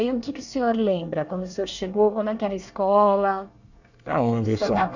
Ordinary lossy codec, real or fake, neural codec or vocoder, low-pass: none; fake; codec, 24 kHz, 1 kbps, SNAC; 7.2 kHz